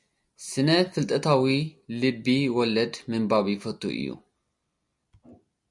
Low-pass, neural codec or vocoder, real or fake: 10.8 kHz; none; real